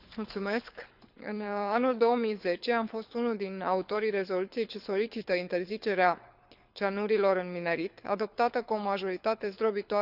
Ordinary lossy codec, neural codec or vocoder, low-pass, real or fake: none; codec, 24 kHz, 6 kbps, HILCodec; 5.4 kHz; fake